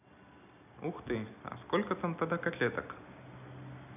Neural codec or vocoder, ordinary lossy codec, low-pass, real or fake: none; none; 3.6 kHz; real